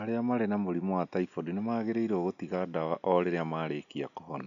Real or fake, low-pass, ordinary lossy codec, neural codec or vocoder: real; 7.2 kHz; none; none